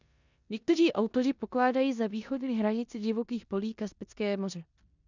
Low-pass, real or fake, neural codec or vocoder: 7.2 kHz; fake; codec, 16 kHz in and 24 kHz out, 0.9 kbps, LongCat-Audio-Codec, four codebook decoder